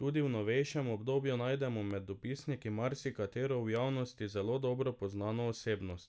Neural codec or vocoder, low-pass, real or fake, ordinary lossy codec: none; none; real; none